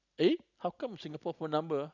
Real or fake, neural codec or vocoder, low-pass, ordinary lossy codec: real; none; 7.2 kHz; none